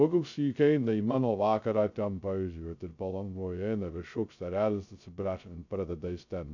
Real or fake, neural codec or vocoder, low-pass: fake; codec, 16 kHz, 0.2 kbps, FocalCodec; 7.2 kHz